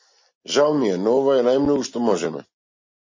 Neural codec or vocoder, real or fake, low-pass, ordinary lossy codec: none; real; 7.2 kHz; MP3, 32 kbps